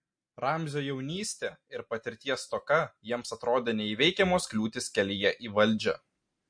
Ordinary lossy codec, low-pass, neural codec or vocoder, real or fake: MP3, 48 kbps; 9.9 kHz; none; real